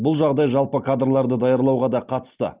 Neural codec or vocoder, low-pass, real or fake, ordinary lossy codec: none; 3.6 kHz; real; Opus, 24 kbps